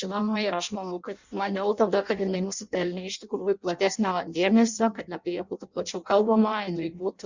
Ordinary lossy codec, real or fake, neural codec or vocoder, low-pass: Opus, 64 kbps; fake; codec, 16 kHz in and 24 kHz out, 0.6 kbps, FireRedTTS-2 codec; 7.2 kHz